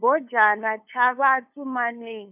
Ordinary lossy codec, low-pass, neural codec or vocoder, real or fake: none; 3.6 kHz; codec, 16 kHz, 2 kbps, FunCodec, trained on LibriTTS, 25 frames a second; fake